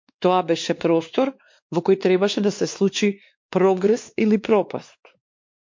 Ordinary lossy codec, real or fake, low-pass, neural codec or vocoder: MP3, 48 kbps; fake; 7.2 kHz; codec, 16 kHz, 2 kbps, X-Codec, WavLM features, trained on Multilingual LibriSpeech